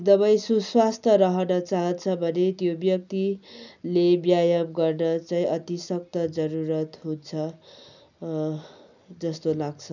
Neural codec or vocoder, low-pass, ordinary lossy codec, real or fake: none; 7.2 kHz; none; real